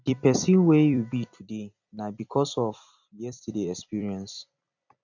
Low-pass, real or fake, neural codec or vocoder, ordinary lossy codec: 7.2 kHz; fake; vocoder, 44.1 kHz, 128 mel bands every 256 samples, BigVGAN v2; none